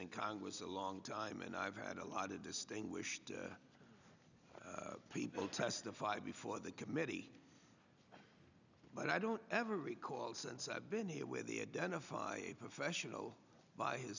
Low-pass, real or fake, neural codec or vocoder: 7.2 kHz; real; none